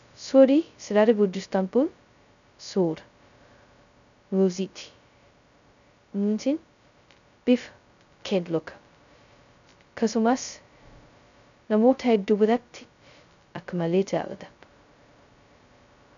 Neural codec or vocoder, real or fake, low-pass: codec, 16 kHz, 0.2 kbps, FocalCodec; fake; 7.2 kHz